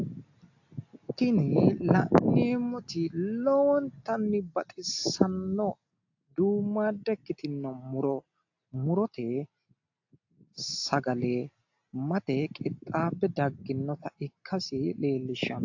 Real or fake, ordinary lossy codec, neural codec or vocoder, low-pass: real; AAC, 48 kbps; none; 7.2 kHz